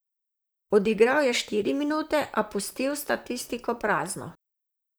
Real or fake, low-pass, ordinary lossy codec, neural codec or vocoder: fake; none; none; vocoder, 44.1 kHz, 128 mel bands, Pupu-Vocoder